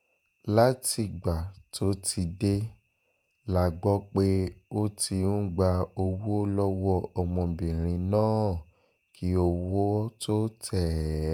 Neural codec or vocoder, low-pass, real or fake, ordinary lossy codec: vocoder, 48 kHz, 128 mel bands, Vocos; none; fake; none